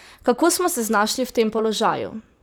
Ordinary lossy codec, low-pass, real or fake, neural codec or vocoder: none; none; fake; vocoder, 44.1 kHz, 128 mel bands, Pupu-Vocoder